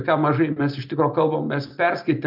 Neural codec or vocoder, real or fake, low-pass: none; real; 5.4 kHz